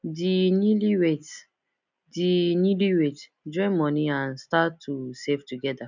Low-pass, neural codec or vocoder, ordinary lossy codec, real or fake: 7.2 kHz; none; none; real